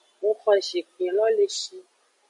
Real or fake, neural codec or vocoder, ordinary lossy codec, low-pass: real; none; MP3, 64 kbps; 10.8 kHz